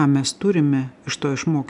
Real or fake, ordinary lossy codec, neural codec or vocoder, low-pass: real; MP3, 96 kbps; none; 10.8 kHz